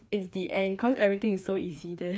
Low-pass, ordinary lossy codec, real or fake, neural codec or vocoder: none; none; fake; codec, 16 kHz, 2 kbps, FreqCodec, larger model